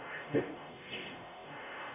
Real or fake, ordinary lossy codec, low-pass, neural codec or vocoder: fake; none; 3.6 kHz; codec, 44.1 kHz, 0.9 kbps, DAC